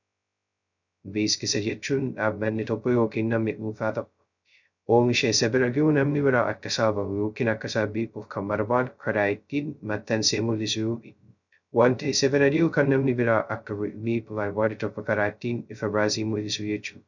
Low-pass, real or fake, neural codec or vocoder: 7.2 kHz; fake; codec, 16 kHz, 0.2 kbps, FocalCodec